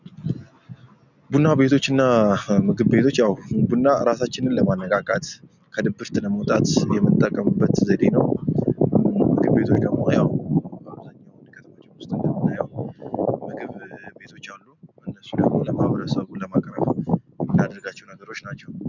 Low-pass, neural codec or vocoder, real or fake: 7.2 kHz; none; real